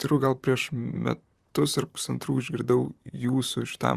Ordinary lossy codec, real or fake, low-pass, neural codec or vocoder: Opus, 64 kbps; fake; 14.4 kHz; vocoder, 44.1 kHz, 128 mel bands, Pupu-Vocoder